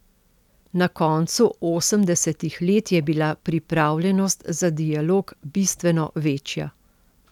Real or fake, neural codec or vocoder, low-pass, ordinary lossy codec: real; none; 19.8 kHz; none